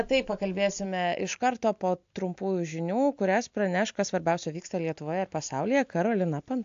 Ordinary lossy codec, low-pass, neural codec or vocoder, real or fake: AAC, 64 kbps; 7.2 kHz; none; real